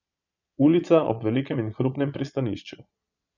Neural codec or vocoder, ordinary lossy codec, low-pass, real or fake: vocoder, 22.05 kHz, 80 mel bands, Vocos; none; 7.2 kHz; fake